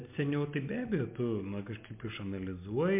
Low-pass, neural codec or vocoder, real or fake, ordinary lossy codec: 3.6 kHz; none; real; MP3, 32 kbps